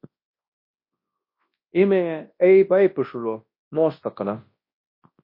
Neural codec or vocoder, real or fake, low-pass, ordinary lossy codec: codec, 24 kHz, 0.9 kbps, WavTokenizer, large speech release; fake; 5.4 kHz; MP3, 32 kbps